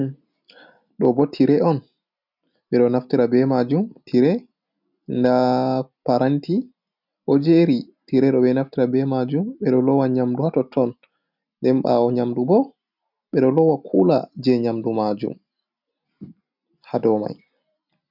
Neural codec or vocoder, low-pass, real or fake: none; 5.4 kHz; real